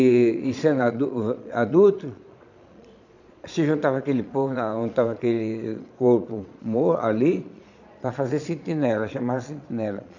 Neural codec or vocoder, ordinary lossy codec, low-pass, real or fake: vocoder, 22.05 kHz, 80 mel bands, Vocos; none; 7.2 kHz; fake